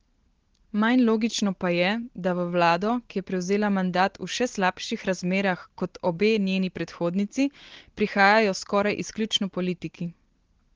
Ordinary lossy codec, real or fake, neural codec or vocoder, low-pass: Opus, 16 kbps; real; none; 7.2 kHz